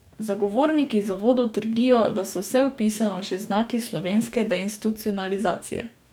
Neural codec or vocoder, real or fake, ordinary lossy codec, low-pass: codec, 44.1 kHz, 2.6 kbps, DAC; fake; none; 19.8 kHz